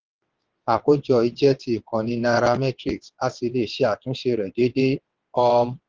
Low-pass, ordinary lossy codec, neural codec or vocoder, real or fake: 7.2 kHz; Opus, 16 kbps; vocoder, 22.05 kHz, 80 mel bands, WaveNeXt; fake